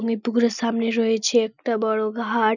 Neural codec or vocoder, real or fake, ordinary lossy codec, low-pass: none; real; none; 7.2 kHz